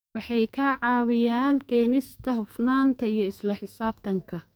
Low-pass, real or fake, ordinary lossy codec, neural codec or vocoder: none; fake; none; codec, 44.1 kHz, 2.6 kbps, SNAC